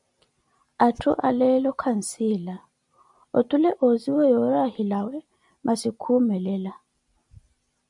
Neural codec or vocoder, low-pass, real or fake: none; 10.8 kHz; real